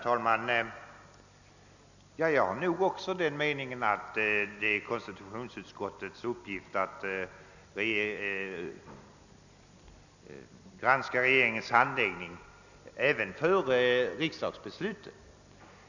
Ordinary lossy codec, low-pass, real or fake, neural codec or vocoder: none; 7.2 kHz; real; none